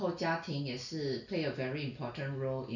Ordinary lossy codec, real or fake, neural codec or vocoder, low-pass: none; real; none; 7.2 kHz